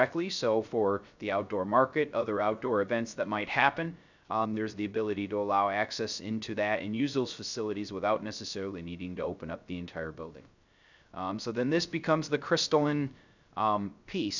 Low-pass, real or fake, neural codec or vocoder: 7.2 kHz; fake; codec, 16 kHz, 0.3 kbps, FocalCodec